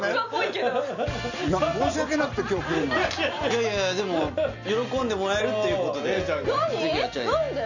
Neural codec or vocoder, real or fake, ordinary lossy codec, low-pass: none; real; none; 7.2 kHz